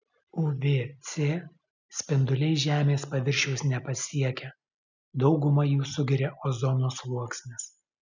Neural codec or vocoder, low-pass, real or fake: none; 7.2 kHz; real